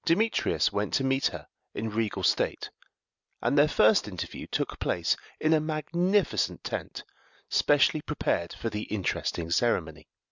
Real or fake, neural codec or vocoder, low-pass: real; none; 7.2 kHz